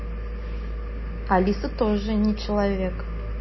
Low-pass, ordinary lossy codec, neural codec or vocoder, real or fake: 7.2 kHz; MP3, 24 kbps; none; real